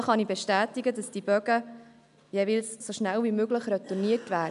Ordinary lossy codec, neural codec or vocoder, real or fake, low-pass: AAC, 96 kbps; none; real; 10.8 kHz